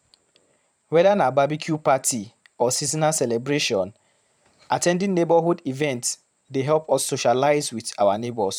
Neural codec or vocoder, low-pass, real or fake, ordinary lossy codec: vocoder, 44.1 kHz, 128 mel bands every 512 samples, BigVGAN v2; 19.8 kHz; fake; none